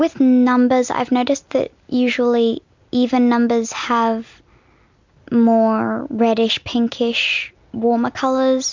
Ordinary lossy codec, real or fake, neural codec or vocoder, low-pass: MP3, 64 kbps; real; none; 7.2 kHz